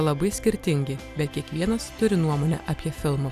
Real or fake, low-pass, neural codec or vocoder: real; 14.4 kHz; none